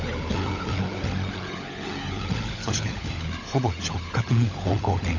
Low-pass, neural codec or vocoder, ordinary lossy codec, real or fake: 7.2 kHz; codec, 16 kHz, 16 kbps, FunCodec, trained on LibriTTS, 50 frames a second; none; fake